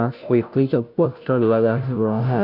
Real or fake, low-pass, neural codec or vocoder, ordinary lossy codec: fake; 5.4 kHz; codec, 16 kHz, 0.5 kbps, FunCodec, trained on Chinese and English, 25 frames a second; none